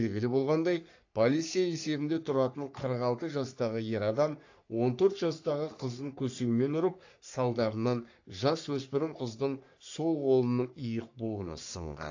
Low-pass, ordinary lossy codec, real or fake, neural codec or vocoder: 7.2 kHz; AAC, 48 kbps; fake; codec, 44.1 kHz, 3.4 kbps, Pupu-Codec